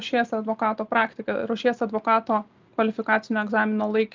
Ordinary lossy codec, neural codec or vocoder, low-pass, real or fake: Opus, 24 kbps; none; 7.2 kHz; real